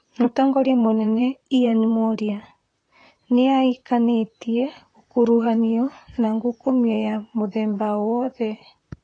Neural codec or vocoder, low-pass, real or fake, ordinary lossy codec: vocoder, 44.1 kHz, 128 mel bands, Pupu-Vocoder; 9.9 kHz; fake; AAC, 32 kbps